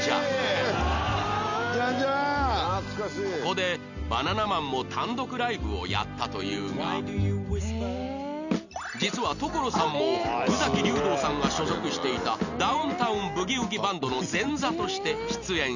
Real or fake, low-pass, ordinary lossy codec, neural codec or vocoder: real; 7.2 kHz; none; none